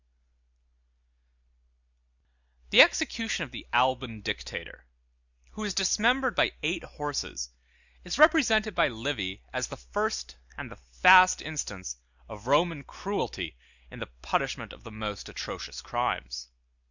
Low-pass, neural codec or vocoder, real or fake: 7.2 kHz; none; real